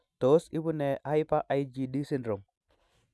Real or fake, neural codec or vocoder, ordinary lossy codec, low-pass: real; none; none; none